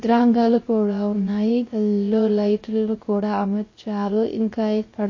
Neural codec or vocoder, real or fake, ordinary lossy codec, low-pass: codec, 16 kHz, 0.3 kbps, FocalCodec; fake; MP3, 32 kbps; 7.2 kHz